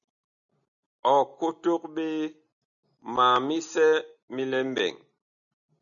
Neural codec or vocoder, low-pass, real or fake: none; 7.2 kHz; real